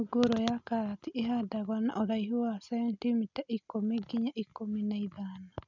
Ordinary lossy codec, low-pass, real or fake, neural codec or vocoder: none; 7.2 kHz; real; none